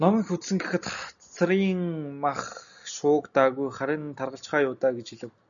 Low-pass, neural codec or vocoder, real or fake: 7.2 kHz; none; real